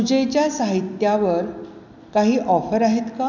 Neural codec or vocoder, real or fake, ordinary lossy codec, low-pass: none; real; none; 7.2 kHz